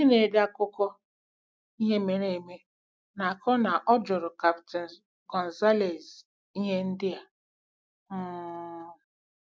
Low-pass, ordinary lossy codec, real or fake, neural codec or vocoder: none; none; real; none